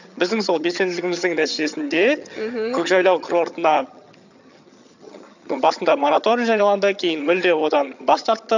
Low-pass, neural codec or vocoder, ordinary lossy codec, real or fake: 7.2 kHz; vocoder, 22.05 kHz, 80 mel bands, HiFi-GAN; none; fake